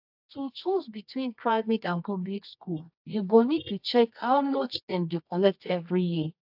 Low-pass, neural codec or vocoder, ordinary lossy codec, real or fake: 5.4 kHz; codec, 24 kHz, 0.9 kbps, WavTokenizer, medium music audio release; AAC, 48 kbps; fake